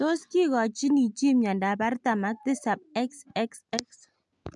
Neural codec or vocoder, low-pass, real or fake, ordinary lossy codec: none; 10.8 kHz; real; MP3, 96 kbps